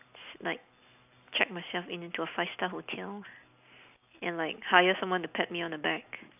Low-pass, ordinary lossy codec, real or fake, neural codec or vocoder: 3.6 kHz; none; real; none